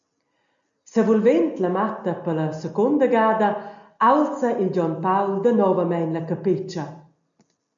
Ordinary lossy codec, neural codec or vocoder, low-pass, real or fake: MP3, 64 kbps; none; 7.2 kHz; real